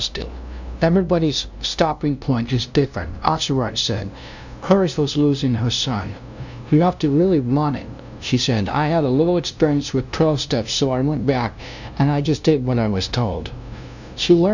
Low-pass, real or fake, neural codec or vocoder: 7.2 kHz; fake; codec, 16 kHz, 0.5 kbps, FunCodec, trained on LibriTTS, 25 frames a second